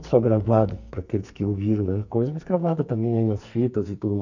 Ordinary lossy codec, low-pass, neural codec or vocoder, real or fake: none; 7.2 kHz; codec, 44.1 kHz, 2.6 kbps, SNAC; fake